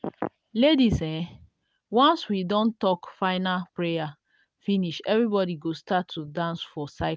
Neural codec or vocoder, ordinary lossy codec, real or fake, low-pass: none; none; real; none